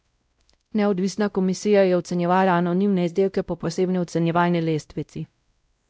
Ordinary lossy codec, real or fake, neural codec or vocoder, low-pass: none; fake; codec, 16 kHz, 0.5 kbps, X-Codec, WavLM features, trained on Multilingual LibriSpeech; none